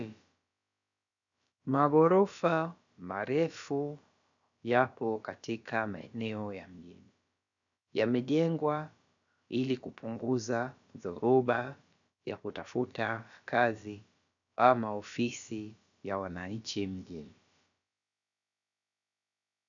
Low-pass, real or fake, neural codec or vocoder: 7.2 kHz; fake; codec, 16 kHz, about 1 kbps, DyCAST, with the encoder's durations